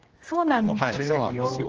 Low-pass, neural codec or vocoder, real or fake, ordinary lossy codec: 7.2 kHz; codec, 16 kHz, 1 kbps, X-Codec, HuBERT features, trained on general audio; fake; Opus, 24 kbps